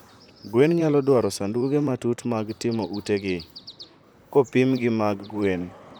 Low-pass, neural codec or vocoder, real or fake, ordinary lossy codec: none; vocoder, 44.1 kHz, 128 mel bands, Pupu-Vocoder; fake; none